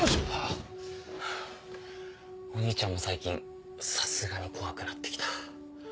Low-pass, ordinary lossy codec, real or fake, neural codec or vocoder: none; none; real; none